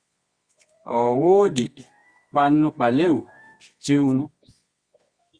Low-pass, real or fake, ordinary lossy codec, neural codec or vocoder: 9.9 kHz; fake; Opus, 64 kbps; codec, 24 kHz, 0.9 kbps, WavTokenizer, medium music audio release